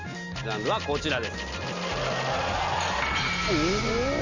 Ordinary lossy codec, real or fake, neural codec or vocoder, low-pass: none; real; none; 7.2 kHz